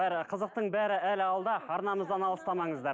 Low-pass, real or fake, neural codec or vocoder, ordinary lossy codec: none; real; none; none